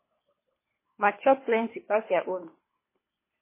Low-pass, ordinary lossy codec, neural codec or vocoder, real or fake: 3.6 kHz; MP3, 16 kbps; codec, 24 kHz, 3 kbps, HILCodec; fake